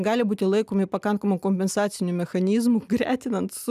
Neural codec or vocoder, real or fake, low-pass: none; real; 14.4 kHz